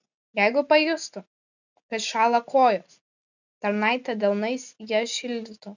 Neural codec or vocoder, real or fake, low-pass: none; real; 7.2 kHz